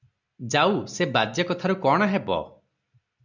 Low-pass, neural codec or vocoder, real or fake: 7.2 kHz; none; real